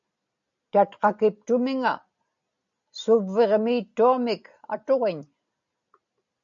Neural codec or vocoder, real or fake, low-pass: none; real; 7.2 kHz